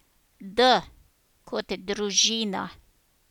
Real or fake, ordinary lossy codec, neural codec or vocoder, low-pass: real; none; none; 19.8 kHz